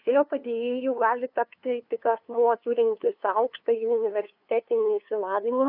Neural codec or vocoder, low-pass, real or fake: codec, 16 kHz, 2 kbps, FunCodec, trained on LibriTTS, 25 frames a second; 5.4 kHz; fake